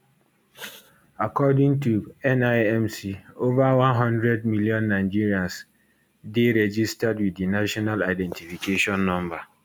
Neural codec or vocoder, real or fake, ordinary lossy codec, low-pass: none; real; none; none